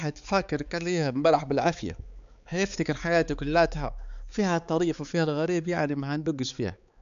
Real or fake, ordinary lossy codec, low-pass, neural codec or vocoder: fake; MP3, 96 kbps; 7.2 kHz; codec, 16 kHz, 4 kbps, X-Codec, HuBERT features, trained on balanced general audio